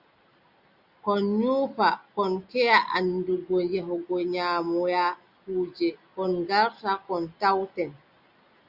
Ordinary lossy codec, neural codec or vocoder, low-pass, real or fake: Opus, 64 kbps; none; 5.4 kHz; real